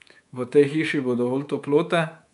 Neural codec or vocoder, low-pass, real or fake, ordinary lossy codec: codec, 24 kHz, 3.1 kbps, DualCodec; 10.8 kHz; fake; none